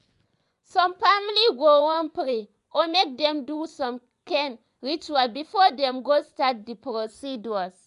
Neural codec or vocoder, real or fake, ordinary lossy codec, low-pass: vocoder, 24 kHz, 100 mel bands, Vocos; fake; none; 10.8 kHz